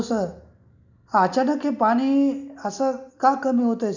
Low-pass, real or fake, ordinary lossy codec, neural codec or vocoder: 7.2 kHz; real; none; none